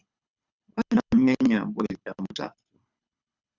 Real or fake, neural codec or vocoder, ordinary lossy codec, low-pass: fake; codec, 24 kHz, 6 kbps, HILCodec; Opus, 64 kbps; 7.2 kHz